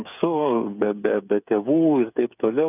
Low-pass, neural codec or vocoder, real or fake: 3.6 kHz; codec, 16 kHz, 8 kbps, FreqCodec, smaller model; fake